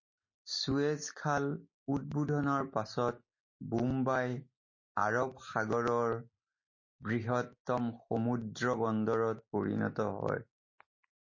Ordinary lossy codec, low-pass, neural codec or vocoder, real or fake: MP3, 32 kbps; 7.2 kHz; none; real